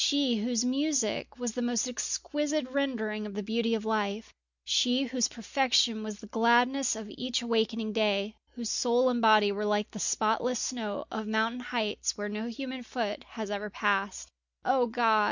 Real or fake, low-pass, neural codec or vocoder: real; 7.2 kHz; none